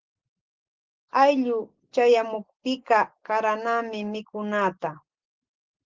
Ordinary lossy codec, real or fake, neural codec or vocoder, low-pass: Opus, 16 kbps; real; none; 7.2 kHz